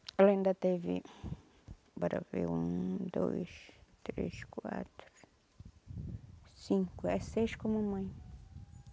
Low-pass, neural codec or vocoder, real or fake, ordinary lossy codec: none; none; real; none